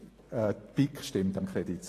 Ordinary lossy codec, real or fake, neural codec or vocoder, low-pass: AAC, 48 kbps; real; none; 14.4 kHz